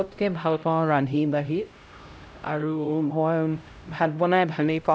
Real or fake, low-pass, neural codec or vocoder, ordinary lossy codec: fake; none; codec, 16 kHz, 0.5 kbps, X-Codec, HuBERT features, trained on LibriSpeech; none